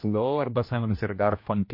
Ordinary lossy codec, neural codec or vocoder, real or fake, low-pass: MP3, 32 kbps; codec, 16 kHz, 1 kbps, X-Codec, HuBERT features, trained on general audio; fake; 5.4 kHz